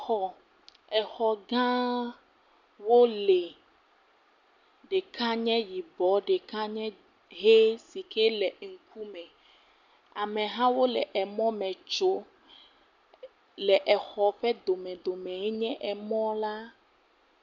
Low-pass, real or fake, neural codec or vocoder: 7.2 kHz; real; none